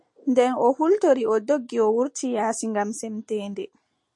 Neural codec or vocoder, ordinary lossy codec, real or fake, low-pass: none; MP3, 48 kbps; real; 10.8 kHz